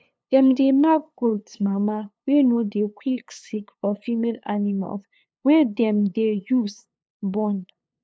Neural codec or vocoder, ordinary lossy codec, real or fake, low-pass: codec, 16 kHz, 2 kbps, FunCodec, trained on LibriTTS, 25 frames a second; none; fake; none